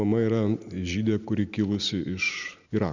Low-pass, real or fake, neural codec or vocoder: 7.2 kHz; real; none